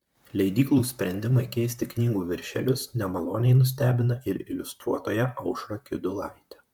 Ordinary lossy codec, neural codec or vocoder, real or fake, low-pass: MP3, 96 kbps; vocoder, 44.1 kHz, 128 mel bands, Pupu-Vocoder; fake; 19.8 kHz